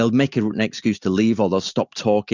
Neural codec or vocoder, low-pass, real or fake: none; 7.2 kHz; real